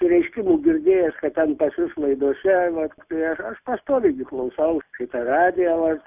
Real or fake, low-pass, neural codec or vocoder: real; 3.6 kHz; none